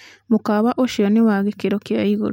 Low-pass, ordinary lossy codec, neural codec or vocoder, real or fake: 19.8 kHz; MP3, 64 kbps; none; real